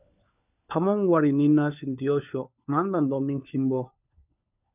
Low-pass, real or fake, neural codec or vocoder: 3.6 kHz; fake; codec, 16 kHz, 4 kbps, FunCodec, trained on LibriTTS, 50 frames a second